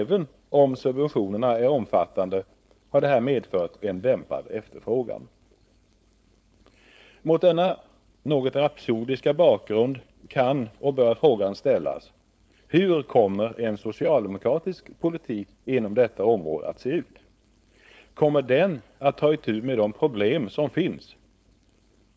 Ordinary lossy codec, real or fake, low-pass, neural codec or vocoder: none; fake; none; codec, 16 kHz, 4.8 kbps, FACodec